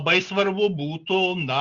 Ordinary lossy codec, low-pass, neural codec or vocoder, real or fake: Opus, 64 kbps; 7.2 kHz; none; real